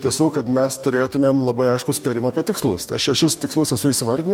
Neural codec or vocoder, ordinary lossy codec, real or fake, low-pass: codec, 44.1 kHz, 2.6 kbps, DAC; MP3, 96 kbps; fake; 19.8 kHz